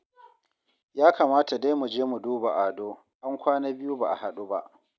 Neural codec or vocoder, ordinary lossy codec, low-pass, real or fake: none; none; none; real